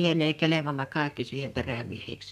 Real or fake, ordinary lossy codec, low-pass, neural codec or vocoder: fake; none; 14.4 kHz; codec, 32 kHz, 1.9 kbps, SNAC